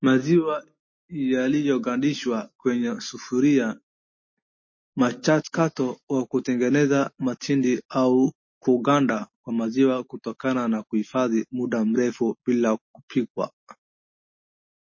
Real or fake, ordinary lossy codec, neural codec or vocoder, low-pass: real; MP3, 32 kbps; none; 7.2 kHz